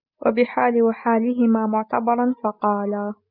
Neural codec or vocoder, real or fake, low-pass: none; real; 5.4 kHz